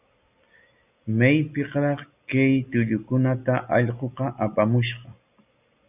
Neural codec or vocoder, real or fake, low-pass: none; real; 3.6 kHz